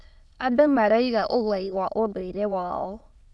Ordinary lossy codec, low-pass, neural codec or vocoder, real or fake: none; none; autoencoder, 22.05 kHz, a latent of 192 numbers a frame, VITS, trained on many speakers; fake